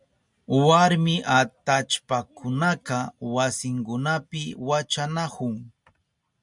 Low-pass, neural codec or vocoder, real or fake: 10.8 kHz; none; real